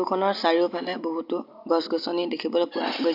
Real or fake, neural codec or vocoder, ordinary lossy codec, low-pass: real; none; MP3, 48 kbps; 5.4 kHz